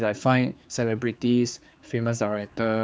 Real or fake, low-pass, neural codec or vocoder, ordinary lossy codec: fake; none; codec, 16 kHz, 4 kbps, X-Codec, HuBERT features, trained on general audio; none